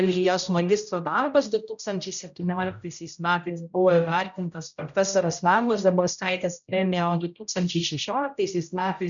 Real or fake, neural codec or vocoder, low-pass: fake; codec, 16 kHz, 0.5 kbps, X-Codec, HuBERT features, trained on general audio; 7.2 kHz